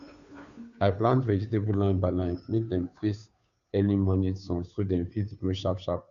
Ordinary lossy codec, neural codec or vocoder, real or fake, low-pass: none; codec, 16 kHz, 2 kbps, FunCodec, trained on Chinese and English, 25 frames a second; fake; 7.2 kHz